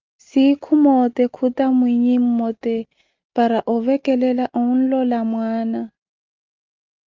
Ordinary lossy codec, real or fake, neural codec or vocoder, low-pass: Opus, 24 kbps; real; none; 7.2 kHz